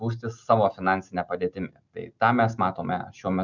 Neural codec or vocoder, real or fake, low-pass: none; real; 7.2 kHz